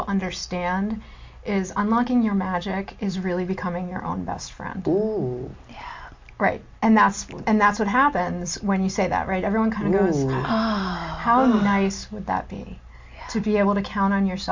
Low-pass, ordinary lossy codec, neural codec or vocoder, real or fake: 7.2 kHz; MP3, 48 kbps; none; real